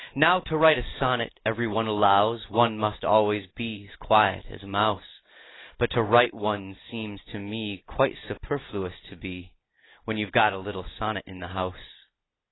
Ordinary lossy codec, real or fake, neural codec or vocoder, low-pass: AAC, 16 kbps; real; none; 7.2 kHz